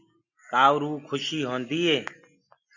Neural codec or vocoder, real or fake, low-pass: none; real; 7.2 kHz